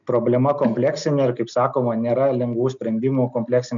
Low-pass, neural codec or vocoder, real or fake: 7.2 kHz; none; real